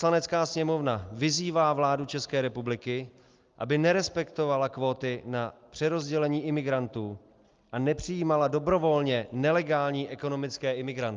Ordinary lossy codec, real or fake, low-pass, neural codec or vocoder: Opus, 32 kbps; real; 7.2 kHz; none